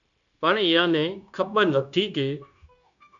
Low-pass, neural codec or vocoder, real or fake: 7.2 kHz; codec, 16 kHz, 0.9 kbps, LongCat-Audio-Codec; fake